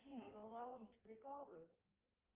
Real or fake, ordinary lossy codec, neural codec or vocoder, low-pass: fake; Opus, 32 kbps; codec, 16 kHz in and 24 kHz out, 1.1 kbps, FireRedTTS-2 codec; 3.6 kHz